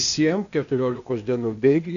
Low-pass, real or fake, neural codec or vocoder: 7.2 kHz; fake; codec, 16 kHz, 0.8 kbps, ZipCodec